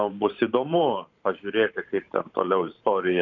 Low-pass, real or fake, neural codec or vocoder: 7.2 kHz; fake; vocoder, 44.1 kHz, 128 mel bands every 512 samples, BigVGAN v2